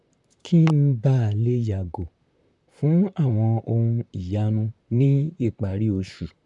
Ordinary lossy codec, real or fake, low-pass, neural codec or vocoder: none; fake; 10.8 kHz; vocoder, 44.1 kHz, 128 mel bands, Pupu-Vocoder